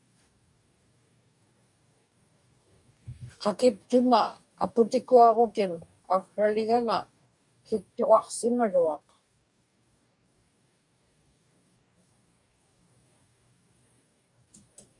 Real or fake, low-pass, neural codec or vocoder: fake; 10.8 kHz; codec, 44.1 kHz, 2.6 kbps, DAC